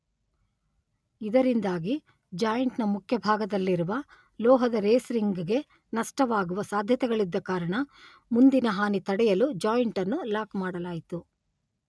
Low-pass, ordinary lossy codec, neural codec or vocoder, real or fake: none; none; none; real